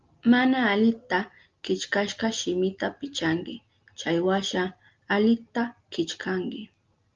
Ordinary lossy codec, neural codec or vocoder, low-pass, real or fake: Opus, 32 kbps; none; 7.2 kHz; real